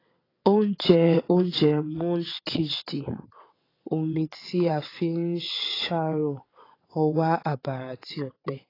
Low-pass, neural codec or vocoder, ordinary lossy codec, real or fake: 5.4 kHz; vocoder, 22.05 kHz, 80 mel bands, WaveNeXt; AAC, 24 kbps; fake